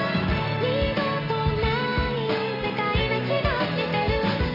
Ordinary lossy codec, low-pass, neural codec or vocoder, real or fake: AAC, 48 kbps; 5.4 kHz; none; real